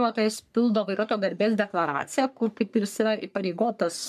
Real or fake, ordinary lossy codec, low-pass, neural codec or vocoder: fake; MP3, 96 kbps; 14.4 kHz; codec, 44.1 kHz, 3.4 kbps, Pupu-Codec